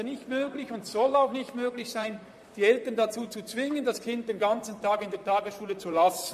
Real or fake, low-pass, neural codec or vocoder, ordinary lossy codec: fake; 14.4 kHz; vocoder, 44.1 kHz, 128 mel bands, Pupu-Vocoder; MP3, 64 kbps